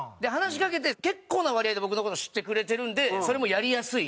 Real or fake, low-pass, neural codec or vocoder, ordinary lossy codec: real; none; none; none